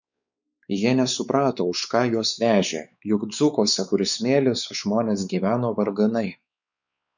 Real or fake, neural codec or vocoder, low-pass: fake; codec, 16 kHz, 4 kbps, X-Codec, WavLM features, trained on Multilingual LibriSpeech; 7.2 kHz